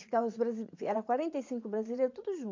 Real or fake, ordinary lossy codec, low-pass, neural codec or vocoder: fake; MP3, 48 kbps; 7.2 kHz; vocoder, 44.1 kHz, 80 mel bands, Vocos